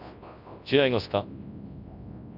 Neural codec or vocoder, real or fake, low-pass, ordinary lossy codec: codec, 24 kHz, 0.9 kbps, WavTokenizer, large speech release; fake; 5.4 kHz; none